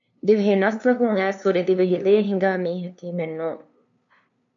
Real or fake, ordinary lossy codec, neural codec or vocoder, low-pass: fake; MP3, 64 kbps; codec, 16 kHz, 2 kbps, FunCodec, trained on LibriTTS, 25 frames a second; 7.2 kHz